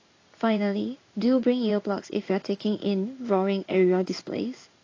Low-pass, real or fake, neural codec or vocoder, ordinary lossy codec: 7.2 kHz; fake; codec, 16 kHz in and 24 kHz out, 1 kbps, XY-Tokenizer; AAC, 32 kbps